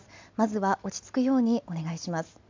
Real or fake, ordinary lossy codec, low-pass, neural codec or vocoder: real; MP3, 64 kbps; 7.2 kHz; none